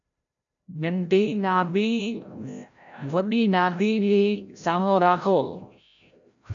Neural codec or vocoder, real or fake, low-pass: codec, 16 kHz, 0.5 kbps, FreqCodec, larger model; fake; 7.2 kHz